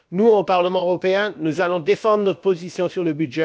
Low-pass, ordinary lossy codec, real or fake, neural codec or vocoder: none; none; fake; codec, 16 kHz, about 1 kbps, DyCAST, with the encoder's durations